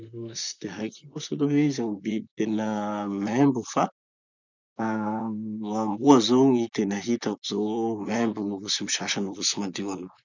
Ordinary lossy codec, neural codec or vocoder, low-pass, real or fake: none; vocoder, 44.1 kHz, 128 mel bands, Pupu-Vocoder; 7.2 kHz; fake